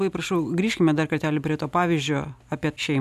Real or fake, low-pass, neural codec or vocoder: real; 14.4 kHz; none